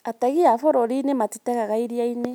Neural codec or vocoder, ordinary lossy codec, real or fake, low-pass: none; none; real; none